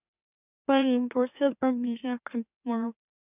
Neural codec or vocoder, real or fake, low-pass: autoencoder, 44.1 kHz, a latent of 192 numbers a frame, MeloTTS; fake; 3.6 kHz